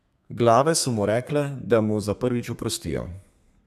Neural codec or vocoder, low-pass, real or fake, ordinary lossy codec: codec, 32 kHz, 1.9 kbps, SNAC; 14.4 kHz; fake; none